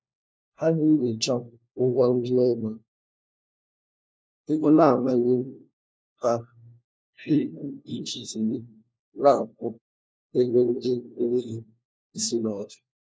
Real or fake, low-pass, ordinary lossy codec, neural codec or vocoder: fake; none; none; codec, 16 kHz, 1 kbps, FunCodec, trained on LibriTTS, 50 frames a second